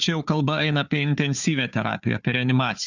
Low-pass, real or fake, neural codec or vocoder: 7.2 kHz; fake; codec, 16 kHz, 4 kbps, FunCodec, trained on Chinese and English, 50 frames a second